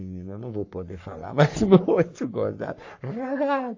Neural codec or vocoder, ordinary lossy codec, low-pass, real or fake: codec, 44.1 kHz, 3.4 kbps, Pupu-Codec; AAC, 48 kbps; 7.2 kHz; fake